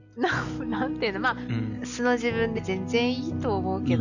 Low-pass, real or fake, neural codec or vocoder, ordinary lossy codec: 7.2 kHz; real; none; none